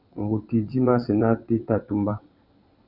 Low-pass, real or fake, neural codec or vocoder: 5.4 kHz; fake; vocoder, 22.05 kHz, 80 mel bands, WaveNeXt